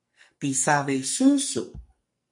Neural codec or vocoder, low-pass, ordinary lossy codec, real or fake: codec, 44.1 kHz, 2.6 kbps, SNAC; 10.8 kHz; MP3, 48 kbps; fake